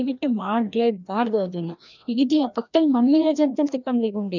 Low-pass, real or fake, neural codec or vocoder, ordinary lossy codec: 7.2 kHz; fake; codec, 16 kHz, 1 kbps, FreqCodec, larger model; none